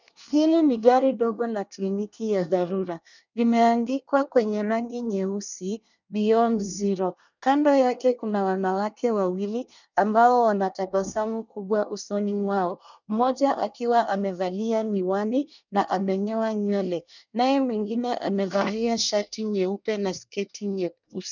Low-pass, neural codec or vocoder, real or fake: 7.2 kHz; codec, 24 kHz, 1 kbps, SNAC; fake